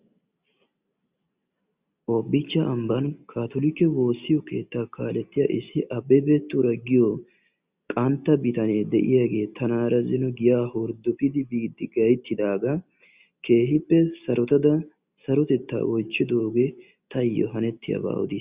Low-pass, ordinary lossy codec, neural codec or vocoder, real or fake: 3.6 kHz; Opus, 64 kbps; none; real